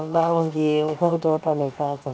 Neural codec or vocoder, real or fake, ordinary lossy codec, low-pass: codec, 16 kHz, 0.7 kbps, FocalCodec; fake; none; none